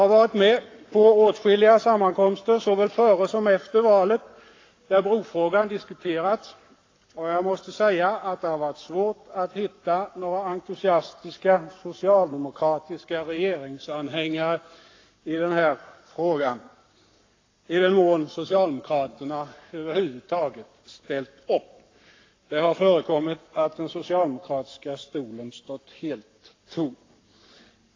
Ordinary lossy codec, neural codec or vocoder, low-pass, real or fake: AAC, 32 kbps; codec, 44.1 kHz, 7.8 kbps, Pupu-Codec; 7.2 kHz; fake